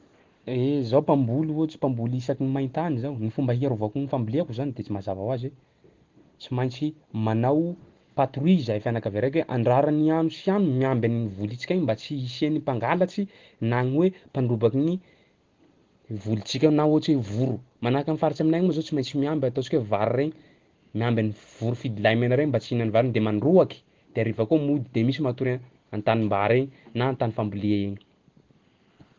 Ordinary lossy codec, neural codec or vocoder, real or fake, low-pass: Opus, 16 kbps; none; real; 7.2 kHz